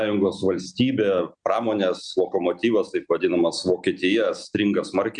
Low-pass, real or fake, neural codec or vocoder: 9.9 kHz; real; none